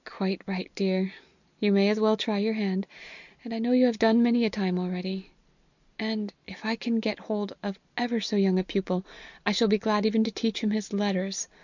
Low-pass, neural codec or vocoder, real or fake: 7.2 kHz; none; real